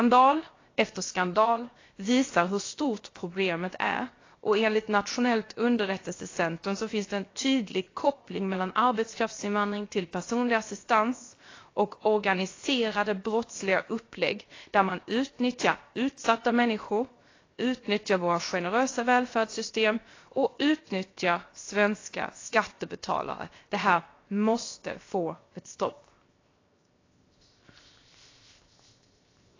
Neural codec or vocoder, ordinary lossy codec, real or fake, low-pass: codec, 16 kHz, 0.7 kbps, FocalCodec; AAC, 32 kbps; fake; 7.2 kHz